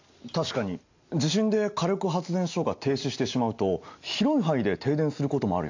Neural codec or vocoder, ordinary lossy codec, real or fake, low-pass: none; AAC, 48 kbps; real; 7.2 kHz